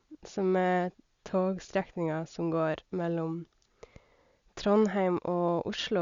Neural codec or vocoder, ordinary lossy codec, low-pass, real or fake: none; Opus, 64 kbps; 7.2 kHz; real